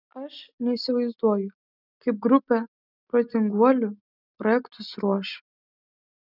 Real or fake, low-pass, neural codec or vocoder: real; 5.4 kHz; none